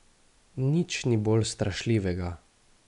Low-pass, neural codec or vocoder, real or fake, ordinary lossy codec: 10.8 kHz; none; real; none